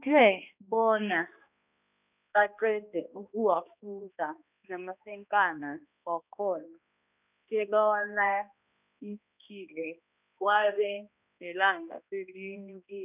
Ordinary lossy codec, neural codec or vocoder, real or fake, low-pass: none; codec, 16 kHz, 1 kbps, X-Codec, HuBERT features, trained on balanced general audio; fake; 3.6 kHz